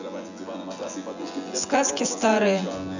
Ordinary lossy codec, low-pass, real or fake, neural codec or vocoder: none; 7.2 kHz; fake; vocoder, 24 kHz, 100 mel bands, Vocos